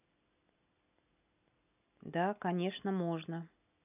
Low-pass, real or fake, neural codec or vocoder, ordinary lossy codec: 3.6 kHz; real; none; none